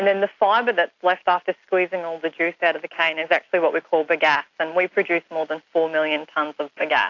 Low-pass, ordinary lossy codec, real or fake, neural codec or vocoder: 7.2 kHz; AAC, 48 kbps; real; none